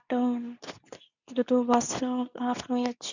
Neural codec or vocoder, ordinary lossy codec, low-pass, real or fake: codec, 24 kHz, 0.9 kbps, WavTokenizer, medium speech release version 2; none; 7.2 kHz; fake